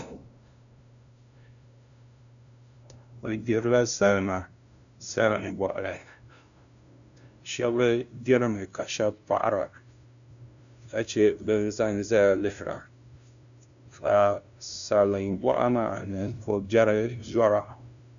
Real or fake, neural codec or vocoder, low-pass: fake; codec, 16 kHz, 0.5 kbps, FunCodec, trained on LibriTTS, 25 frames a second; 7.2 kHz